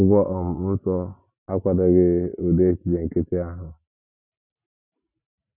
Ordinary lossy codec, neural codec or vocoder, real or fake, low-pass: none; none; real; 3.6 kHz